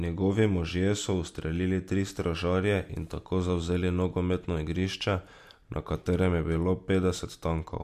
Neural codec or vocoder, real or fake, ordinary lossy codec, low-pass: none; real; MP3, 64 kbps; 14.4 kHz